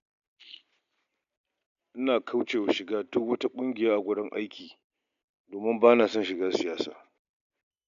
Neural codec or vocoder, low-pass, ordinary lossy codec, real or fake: none; 7.2 kHz; none; real